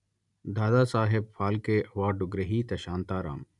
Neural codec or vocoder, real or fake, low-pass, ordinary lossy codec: none; real; 10.8 kHz; none